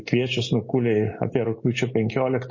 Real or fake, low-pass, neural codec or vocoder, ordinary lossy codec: real; 7.2 kHz; none; MP3, 32 kbps